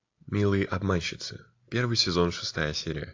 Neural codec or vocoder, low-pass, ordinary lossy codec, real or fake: none; 7.2 kHz; AAC, 48 kbps; real